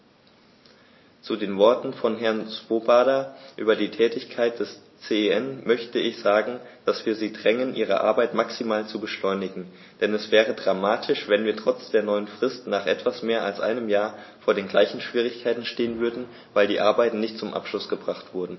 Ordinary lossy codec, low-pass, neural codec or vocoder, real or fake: MP3, 24 kbps; 7.2 kHz; none; real